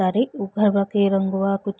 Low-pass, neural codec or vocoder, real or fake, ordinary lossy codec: none; none; real; none